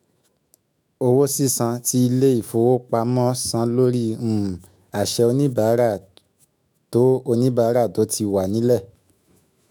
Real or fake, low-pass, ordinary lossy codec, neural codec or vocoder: fake; none; none; autoencoder, 48 kHz, 128 numbers a frame, DAC-VAE, trained on Japanese speech